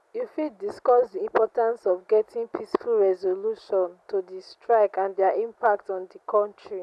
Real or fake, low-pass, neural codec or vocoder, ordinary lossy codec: real; none; none; none